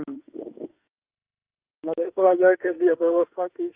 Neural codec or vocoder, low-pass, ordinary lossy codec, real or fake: autoencoder, 48 kHz, 32 numbers a frame, DAC-VAE, trained on Japanese speech; 3.6 kHz; Opus, 24 kbps; fake